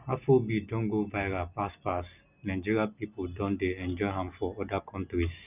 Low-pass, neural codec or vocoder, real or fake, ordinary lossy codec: 3.6 kHz; none; real; none